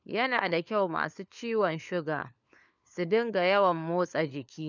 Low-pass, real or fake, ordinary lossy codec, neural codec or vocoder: 7.2 kHz; fake; none; codec, 16 kHz, 4 kbps, FunCodec, trained on LibriTTS, 50 frames a second